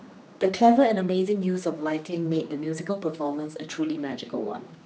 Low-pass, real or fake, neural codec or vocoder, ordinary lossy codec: none; fake; codec, 16 kHz, 2 kbps, X-Codec, HuBERT features, trained on general audio; none